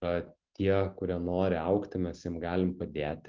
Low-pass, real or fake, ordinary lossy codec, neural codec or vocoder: 7.2 kHz; real; Opus, 32 kbps; none